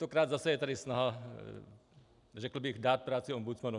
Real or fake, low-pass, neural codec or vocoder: real; 10.8 kHz; none